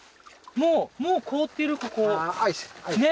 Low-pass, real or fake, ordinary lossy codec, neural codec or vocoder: none; real; none; none